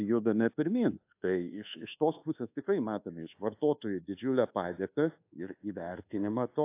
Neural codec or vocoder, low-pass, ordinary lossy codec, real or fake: codec, 24 kHz, 1.2 kbps, DualCodec; 3.6 kHz; AAC, 24 kbps; fake